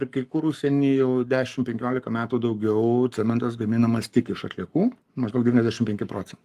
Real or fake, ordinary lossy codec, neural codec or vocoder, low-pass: fake; Opus, 32 kbps; codec, 44.1 kHz, 7.8 kbps, Pupu-Codec; 14.4 kHz